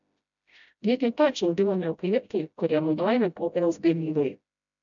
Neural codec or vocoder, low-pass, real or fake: codec, 16 kHz, 0.5 kbps, FreqCodec, smaller model; 7.2 kHz; fake